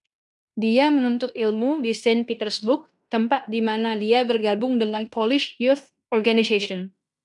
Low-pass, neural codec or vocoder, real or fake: 10.8 kHz; codec, 16 kHz in and 24 kHz out, 0.9 kbps, LongCat-Audio-Codec, fine tuned four codebook decoder; fake